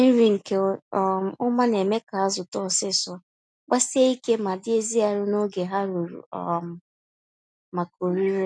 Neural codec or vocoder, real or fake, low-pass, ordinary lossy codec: none; real; none; none